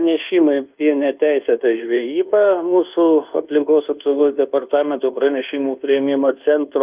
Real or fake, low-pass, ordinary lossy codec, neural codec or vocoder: fake; 3.6 kHz; Opus, 32 kbps; codec, 24 kHz, 1.2 kbps, DualCodec